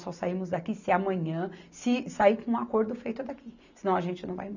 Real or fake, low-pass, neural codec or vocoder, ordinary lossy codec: real; 7.2 kHz; none; none